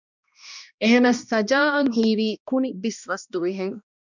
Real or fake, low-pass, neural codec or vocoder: fake; 7.2 kHz; codec, 16 kHz, 1 kbps, X-Codec, HuBERT features, trained on balanced general audio